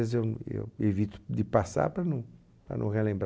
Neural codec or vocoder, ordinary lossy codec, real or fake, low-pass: none; none; real; none